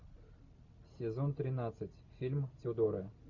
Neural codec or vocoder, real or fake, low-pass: none; real; 7.2 kHz